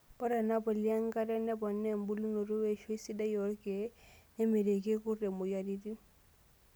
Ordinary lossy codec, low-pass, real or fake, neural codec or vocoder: none; none; real; none